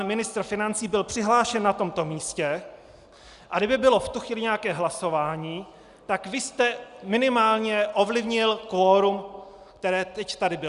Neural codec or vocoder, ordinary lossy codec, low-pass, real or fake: none; Opus, 64 kbps; 10.8 kHz; real